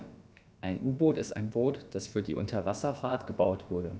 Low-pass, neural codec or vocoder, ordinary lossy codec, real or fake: none; codec, 16 kHz, about 1 kbps, DyCAST, with the encoder's durations; none; fake